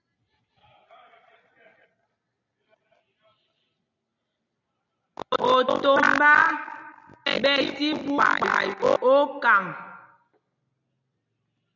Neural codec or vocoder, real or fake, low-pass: none; real; 7.2 kHz